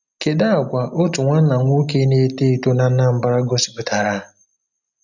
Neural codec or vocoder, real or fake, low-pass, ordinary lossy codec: none; real; 7.2 kHz; none